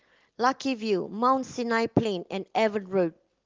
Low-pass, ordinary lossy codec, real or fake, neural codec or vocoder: 7.2 kHz; Opus, 16 kbps; real; none